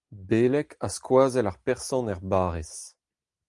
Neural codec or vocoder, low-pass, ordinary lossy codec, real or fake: none; 10.8 kHz; Opus, 32 kbps; real